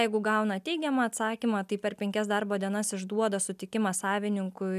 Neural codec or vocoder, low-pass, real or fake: none; 14.4 kHz; real